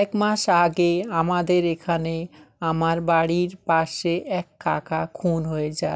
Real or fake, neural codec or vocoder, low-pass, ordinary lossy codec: real; none; none; none